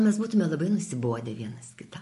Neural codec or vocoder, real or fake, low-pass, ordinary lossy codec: none; real; 14.4 kHz; MP3, 48 kbps